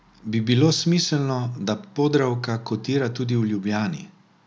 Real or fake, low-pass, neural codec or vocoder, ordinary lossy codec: real; none; none; none